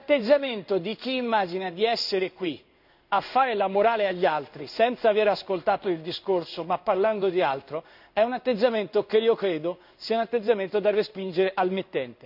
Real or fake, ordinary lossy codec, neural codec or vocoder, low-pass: fake; none; codec, 16 kHz in and 24 kHz out, 1 kbps, XY-Tokenizer; 5.4 kHz